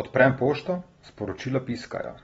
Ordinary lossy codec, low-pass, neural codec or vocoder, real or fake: AAC, 24 kbps; 14.4 kHz; none; real